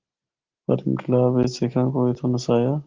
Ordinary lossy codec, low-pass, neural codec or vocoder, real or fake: Opus, 16 kbps; 7.2 kHz; none; real